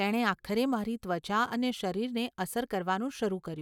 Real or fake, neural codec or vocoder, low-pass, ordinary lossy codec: real; none; 19.8 kHz; none